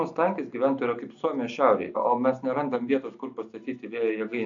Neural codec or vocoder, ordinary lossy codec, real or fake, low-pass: none; AAC, 64 kbps; real; 7.2 kHz